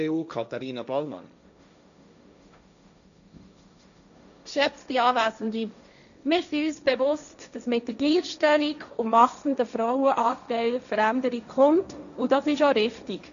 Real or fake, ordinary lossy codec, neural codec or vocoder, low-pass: fake; none; codec, 16 kHz, 1.1 kbps, Voila-Tokenizer; 7.2 kHz